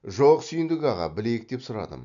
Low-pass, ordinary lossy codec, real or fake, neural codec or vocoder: 7.2 kHz; none; real; none